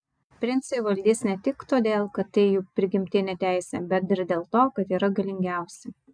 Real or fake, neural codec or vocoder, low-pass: real; none; 9.9 kHz